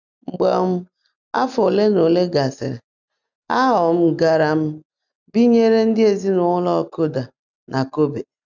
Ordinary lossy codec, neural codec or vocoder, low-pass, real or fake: none; none; 7.2 kHz; real